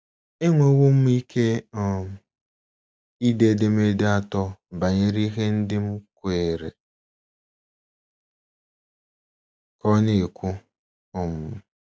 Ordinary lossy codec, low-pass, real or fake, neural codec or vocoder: none; none; real; none